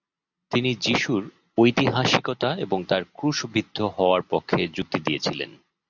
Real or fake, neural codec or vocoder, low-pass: real; none; 7.2 kHz